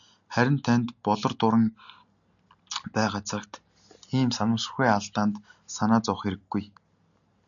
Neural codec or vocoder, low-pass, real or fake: none; 7.2 kHz; real